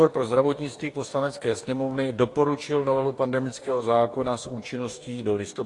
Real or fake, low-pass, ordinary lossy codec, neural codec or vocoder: fake; 10.8 kHz; AAC, 48 kbps; codec, 44.1 kHz, 2.6 kbps, DAC